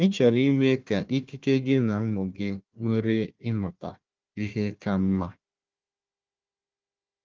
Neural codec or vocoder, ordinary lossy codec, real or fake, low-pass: codec, 16 kHz, 1 kbps, FunCodec, trained on Chinese and English, 50 frames a second; Opus, 24 kbps; fake; 7.2 kHz